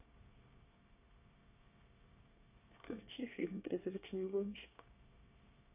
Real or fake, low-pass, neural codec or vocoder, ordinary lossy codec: fake; 3.6 kHz; codec, 24 kHz, 1 kbps, SNAC; none